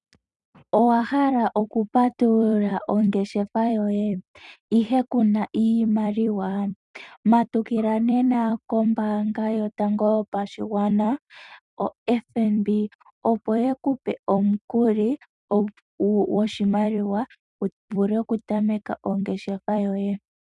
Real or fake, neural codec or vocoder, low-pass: fake; vocoder, 44.1 kHz, 128 mel bands every 256 samples, BigVGAN v2; 10.8 kHz